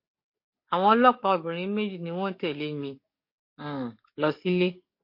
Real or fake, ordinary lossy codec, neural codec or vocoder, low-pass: fake; MP3, 32 kbps; codec, 44.1 kHz, 7.8 kbps, DAC; 5.4 kHz